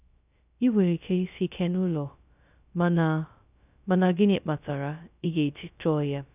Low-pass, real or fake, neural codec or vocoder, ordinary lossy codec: 3.6 kHz; fake; codec, 16 kHz, 0.2 kbps, FocalCodec; none